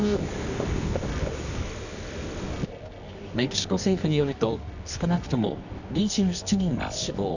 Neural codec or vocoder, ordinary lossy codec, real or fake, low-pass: codec, 24 kHz, 0.9 kbps, WavTokenizer, medium music audio release; none; fake; 7.2 kHz